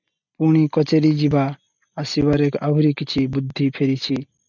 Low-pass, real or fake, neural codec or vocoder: 7.2 kHz; real; none